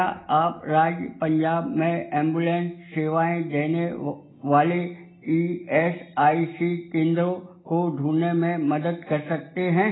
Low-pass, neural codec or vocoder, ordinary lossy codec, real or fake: 7.2 kHz; none; AAC, 16 kbps; real